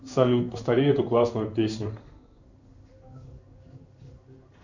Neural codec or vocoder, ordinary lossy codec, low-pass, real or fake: codec, 16 kHz in and 24 kHz out, 1 kbps, XY-Tokenizer; Opus, 64 kbps; 7.2 kHz; fake